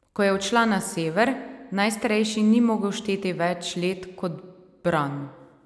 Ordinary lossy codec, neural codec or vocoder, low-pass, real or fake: none; none; none; real